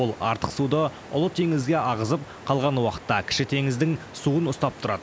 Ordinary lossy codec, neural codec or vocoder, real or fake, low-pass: none; none; real; none